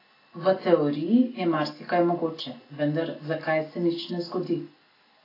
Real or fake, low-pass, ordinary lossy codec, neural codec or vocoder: real; 5.4 kHz; AAC, 24 kbps; none